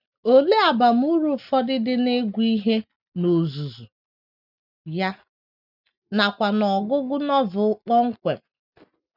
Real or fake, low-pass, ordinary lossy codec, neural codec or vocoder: real; 5.4 kHz; none; none